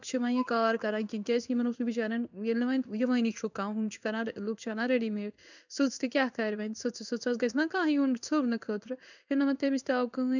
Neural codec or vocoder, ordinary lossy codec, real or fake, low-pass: codec, 16 kHz in and 24 kHz out, 1 kbps, XY-Tokenizer; none; fake; 7.2 kHz